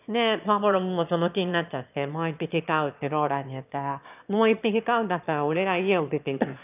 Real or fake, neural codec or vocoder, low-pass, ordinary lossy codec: fake; autoencoder, 22.05 kHz, a latent of 192 numbers a frame, VITS, trained on one speaker; 3.6 kHz; none